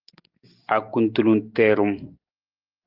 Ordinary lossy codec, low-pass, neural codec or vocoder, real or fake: Opus, 32 kbps; 5.4 kHz; none; real